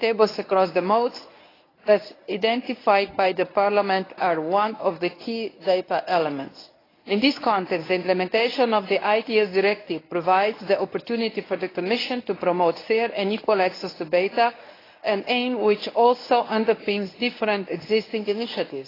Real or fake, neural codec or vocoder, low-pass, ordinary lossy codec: fake; codec, 24 kHz, 0.9 kbps, WavTokenizer, medium speech release version 1; 5.4 kHz; AAC, 24 kbps